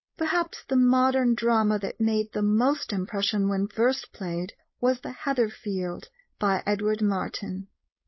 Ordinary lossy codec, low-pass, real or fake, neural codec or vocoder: MP3, 24 kbps; 7.2 kHz; fake; codec, 16 kHz, 4.8 kbps, FACodec